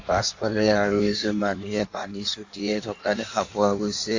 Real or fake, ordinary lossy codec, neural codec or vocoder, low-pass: fake; AAC, 48 kbps; codec, 16 kHz in and 24 kHz out, 1.1 kbps, FireRedTTS-2 codec; 7.2 kHz